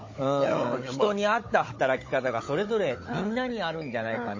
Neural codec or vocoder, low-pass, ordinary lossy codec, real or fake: codec, 16 kHz, 16 kbps, FunCodec, trained on Chinese and English, 50 frames a second; 7.2 kHz; MP3, 32 kbps; fake